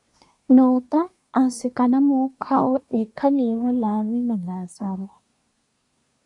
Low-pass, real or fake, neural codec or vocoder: 10.8 kHz; fake; codec, 24 kHz, 1 kbps, SNAC